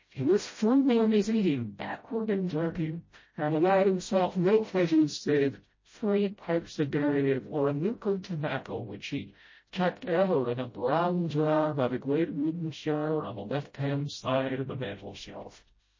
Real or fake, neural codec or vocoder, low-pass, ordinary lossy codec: fake; codec, 16 kHz, 0.5 kbps, FreqCodec, smaller model; 7.2 kHz; MP3, 32 kbps